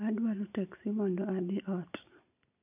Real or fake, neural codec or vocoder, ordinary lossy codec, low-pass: real; none; none; 3.6 kHz